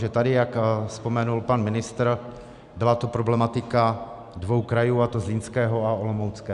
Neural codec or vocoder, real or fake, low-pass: none; real; 10.8 kHz